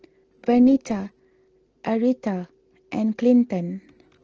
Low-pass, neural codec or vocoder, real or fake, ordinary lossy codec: 7.2 kHz; none; real; Opus, 16 kbps